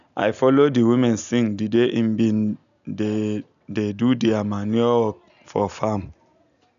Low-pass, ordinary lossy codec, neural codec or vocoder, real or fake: 7.2 kHz; none; none; real